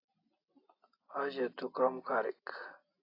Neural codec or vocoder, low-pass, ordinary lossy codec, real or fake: none; 5.4 kHz; AAC, 32 kbps; real